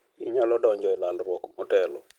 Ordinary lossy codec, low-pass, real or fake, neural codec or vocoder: Opus, 24 kbps; 19.8 kHz; real; none